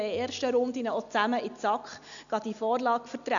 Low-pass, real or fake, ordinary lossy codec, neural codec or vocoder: 7.2 kHz; real; none; none